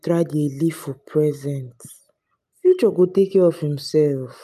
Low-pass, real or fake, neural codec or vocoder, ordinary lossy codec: 14.4 kHz; real; none; none